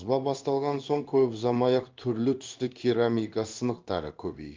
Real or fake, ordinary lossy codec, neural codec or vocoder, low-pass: fake; Opus, 24 kbps; codec, 16 kHz in and 24 kHz out, 1 kbps, XY-Tokenizer; 7.2 kHz